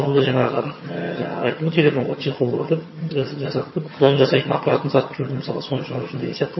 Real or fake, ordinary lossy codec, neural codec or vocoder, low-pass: fake; MP3, 24 kbps; vocoder, 22.05 kHz, 80 mel bands, HiFi-GAN; 7.2 kHz